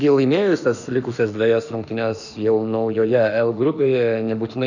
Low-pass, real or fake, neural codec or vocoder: 7.2 kHz; fake; autoencoder, 48 kHz, 32 numbers a frame, DAC-VAE, trained on Japanese speech